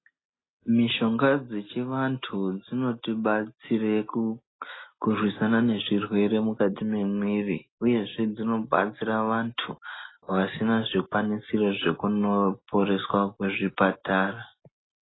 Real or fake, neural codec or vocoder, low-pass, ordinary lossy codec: real; none; 7.2 kHz; AAC, 16 kbps